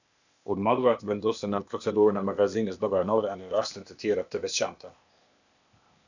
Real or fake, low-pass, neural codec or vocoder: fake; 7.2 kHz; codec, 16 kHz, 0.8 kbps, ZipCodec